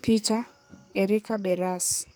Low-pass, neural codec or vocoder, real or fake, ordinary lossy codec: none; codec, 44.1 kHz, 2.6 kbps, SNAC; fake; none